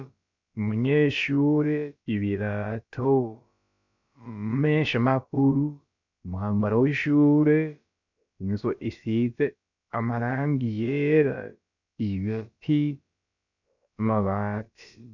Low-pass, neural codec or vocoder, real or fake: 7.2 kHz; codec, 16 kHz, about 1 kbps, DyCAST, with the encoder's durations; fake